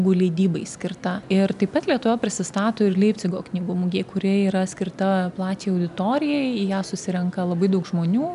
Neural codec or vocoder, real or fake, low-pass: none; real; 10.8 kHz